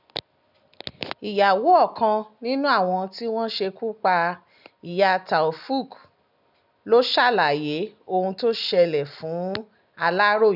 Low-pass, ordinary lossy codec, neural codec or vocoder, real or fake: 5.4 kHz; none; none; real